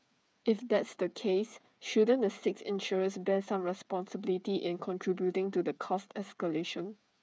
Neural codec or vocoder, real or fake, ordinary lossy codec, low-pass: codec, 16 kHz, 8 kbps, FreqCodec, smaller model; fake; none; none